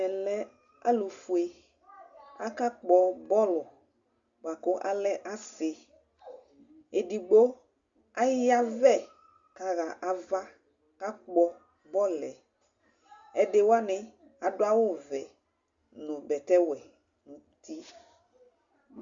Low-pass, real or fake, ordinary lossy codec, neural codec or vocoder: 7.2 kHz; real; Opus, 64 kbps; none